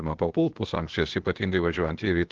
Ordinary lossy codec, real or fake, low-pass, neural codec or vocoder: Opus, 16 kbps; fake; 7.2 kHz; codec, 16 kHz, 0.8 kbps, ZipCodec